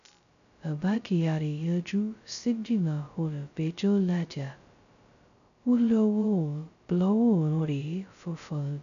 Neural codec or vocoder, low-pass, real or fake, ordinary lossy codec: codec, 16 kHz, 0.2 kbps, FocalCodec; 7.2 kHz; fake; none